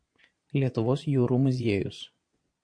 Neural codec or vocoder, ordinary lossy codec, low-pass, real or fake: vocoder, 22.05 kHz, 80 mel bands, Vocos; MP3, 48 kbps; 9.9 kHz; fake